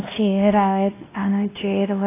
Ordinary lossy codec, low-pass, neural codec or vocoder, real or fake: AAC, 16 kbps; 3.6 kHz; codec, 16 kHz, 1 kbps, X-Codec, WavLM features, trained on Multilingual LibriSpeech; fake